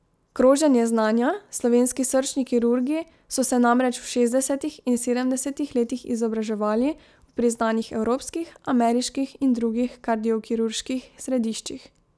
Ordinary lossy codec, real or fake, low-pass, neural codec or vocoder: none; real; none; none